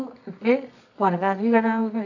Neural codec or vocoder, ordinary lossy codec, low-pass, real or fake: codec, 24 kHz, 0.9 kbps, WavTokenizer, medium music audio release; none; 7.2 kHz; fake